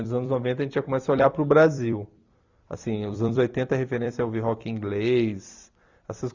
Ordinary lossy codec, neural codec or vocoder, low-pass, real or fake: Opus, 64 kbps; vocoder, 44.1 kHz, 128 mel bands every 256 samples, BigVGAN v2; 7.2 kHz; fake